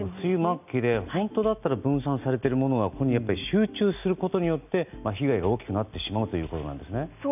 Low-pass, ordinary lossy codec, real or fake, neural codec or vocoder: 3.6 kHz; none; real; none